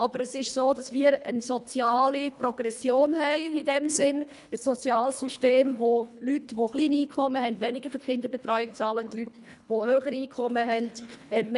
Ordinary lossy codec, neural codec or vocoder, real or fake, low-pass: none; codec, 24 kHz, 1.5 kbps, HILCodec; fake; 10.8 kHz